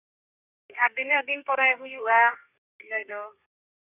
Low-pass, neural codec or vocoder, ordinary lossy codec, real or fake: 3.6 kHz; codec, 32 kHz, 1.9 kbps, SNAC; none; fake